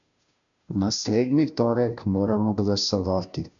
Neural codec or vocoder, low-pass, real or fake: codec, 16 kHz, 0.5 kbps, FunCodec, trained on Chinese and English, 25 frames a second; 7.2 kHz; fake